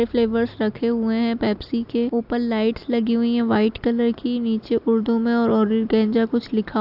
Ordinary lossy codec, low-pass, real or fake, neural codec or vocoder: AAC, 48 kbps; 5.4 kHz; real; none